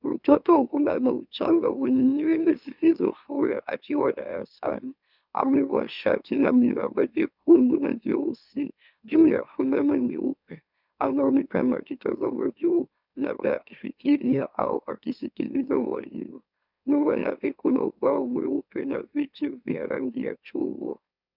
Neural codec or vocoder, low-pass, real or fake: autoencoder, 44.1 kHz, a latent of 192 numbers a frame, MeloTTS; 5.4 kHz; fake